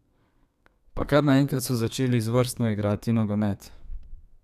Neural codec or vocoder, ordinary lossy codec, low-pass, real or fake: codec, 32 kHz, 1.9 kbps, SNAC; none; 14.4 kHz; fake